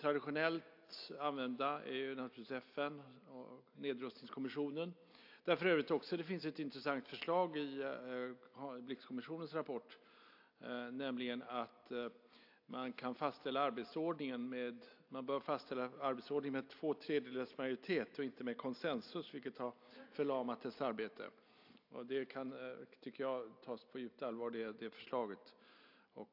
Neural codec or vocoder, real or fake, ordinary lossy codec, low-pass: none; real; AAC, 48 kbps; 5.4 kHz